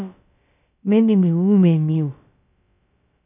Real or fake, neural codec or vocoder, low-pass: fake; codec, 16 kHz, about 1 kbps, DyCAST, with the encoder's durations; 3.6 kHz